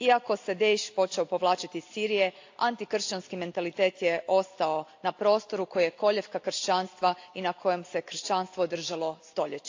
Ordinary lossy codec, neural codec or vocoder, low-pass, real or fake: AAC, 48 kbps; none; 7.2 kHz; real